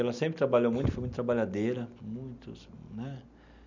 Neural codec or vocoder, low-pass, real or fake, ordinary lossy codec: none; 7.2 kHz; real; none